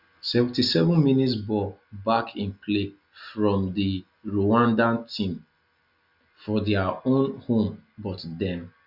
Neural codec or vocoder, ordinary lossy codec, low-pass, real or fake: none; Opus, 64 kbps; 5.4 kHz; real